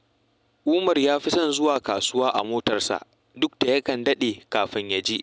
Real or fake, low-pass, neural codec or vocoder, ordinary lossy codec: real; none; none; none